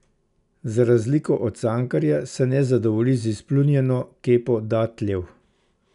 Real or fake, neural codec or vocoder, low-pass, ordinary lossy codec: real; none; 10.8 kHz; none